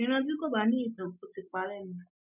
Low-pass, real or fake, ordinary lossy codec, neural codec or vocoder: 3.6 kHz; real; none; none